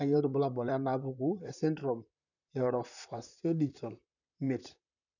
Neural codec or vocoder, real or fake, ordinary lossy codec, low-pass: vocoder, 44.1 kHz, 128 mel bands, Pupu-Vocoder; fake; none; 7.2 kHz